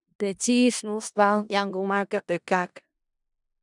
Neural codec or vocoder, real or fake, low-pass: codec, 16 kHz in and 24 kHz out, 0.4 kbps, LongCat-Audio-Codec, four codebook decoder; fake; 10.8 kHz